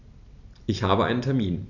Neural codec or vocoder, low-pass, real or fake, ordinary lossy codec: none; 7.2 kHz; real; none